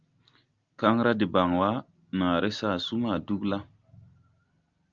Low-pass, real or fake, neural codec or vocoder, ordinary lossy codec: 7.2 kHz; real; none; Opus, 24 kbps